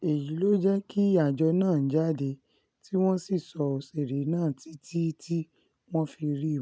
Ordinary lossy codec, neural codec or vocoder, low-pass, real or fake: none; none; none; real